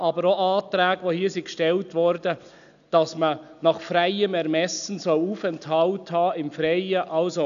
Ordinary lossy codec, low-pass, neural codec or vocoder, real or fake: none; 7.2 kHz; none; real